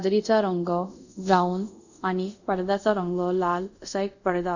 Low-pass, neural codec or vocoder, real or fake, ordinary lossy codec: 7.2 kHz; codec, 24 kHz, 0.5 kbps, DualCodec; fake; none